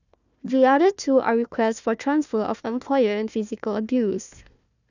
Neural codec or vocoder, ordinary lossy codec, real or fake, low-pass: codec, 16 kHz, 1 kbps, FunCodec, trained on Chinese and English, 50 frames a second; none; fake; 7.2 kHz